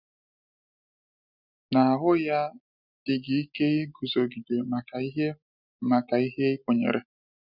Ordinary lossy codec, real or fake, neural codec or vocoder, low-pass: none; real; none; 5.4 kHz